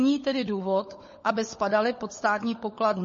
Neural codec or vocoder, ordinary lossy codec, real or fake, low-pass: codec, 16 kHz, 16 kbps, FunCodec, trained on LibriTTS, 50 frames a second; MP3, 32 kbps; fake; 7.2 kHz